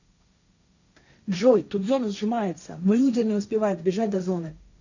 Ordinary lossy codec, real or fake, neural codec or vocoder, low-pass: none; fake; codec, 16 kHz, 1.1 kbps, Voila-Tokenizer; 7.2 kHz